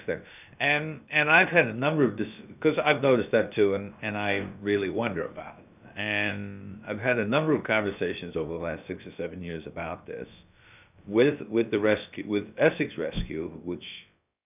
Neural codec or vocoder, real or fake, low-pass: codec, 16 kHz, about 1 kbps, DyCAST, with the encoder's durations; fake; 3.6 kHz